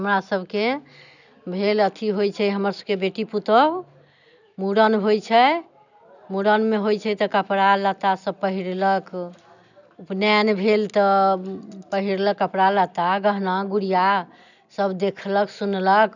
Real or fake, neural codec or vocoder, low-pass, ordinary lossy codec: real; none; 7.2 kHz; none